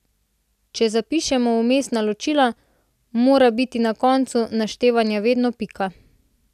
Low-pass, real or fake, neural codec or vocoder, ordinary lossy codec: 14.4 kHz; real; none; none